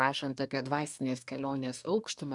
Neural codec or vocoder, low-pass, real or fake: codec, 24 kHz, 1 kbps, SNAC; 10.8 kHz; fake